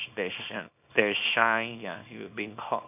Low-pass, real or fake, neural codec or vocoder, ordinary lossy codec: 3.6 kHz; fake; codec, 24 kHz, 0.9 kbps, WavTokenizer, small release; none